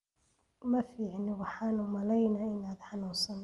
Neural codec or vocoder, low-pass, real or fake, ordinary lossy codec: none; 10.8 kHz; real; Opus, 32 kbps